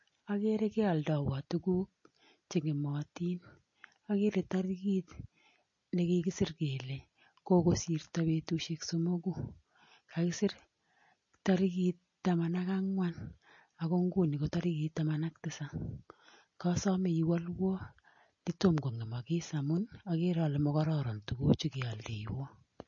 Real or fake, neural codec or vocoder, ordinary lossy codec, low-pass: real; none; MP3, 32 kbps; 7.2 kHz